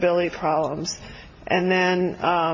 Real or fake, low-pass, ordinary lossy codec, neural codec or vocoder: real; 7.2 kHz; MP3, 32 kbps; none